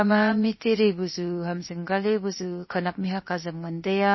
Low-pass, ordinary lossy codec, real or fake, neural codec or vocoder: 7.2 kHz; MP3, 24 kbps; fake; codec, 16 kHz, 0.7 kbps, FocalCodec